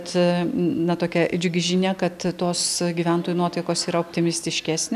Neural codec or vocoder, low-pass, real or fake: none; 14.4 kHz; real